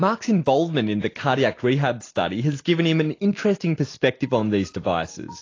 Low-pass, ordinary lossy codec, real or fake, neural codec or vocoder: 7.2 kHz; AAC, 32 kbps; real; none